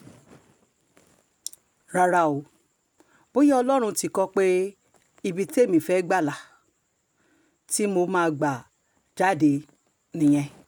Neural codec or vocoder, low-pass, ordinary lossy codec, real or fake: none; none; none; real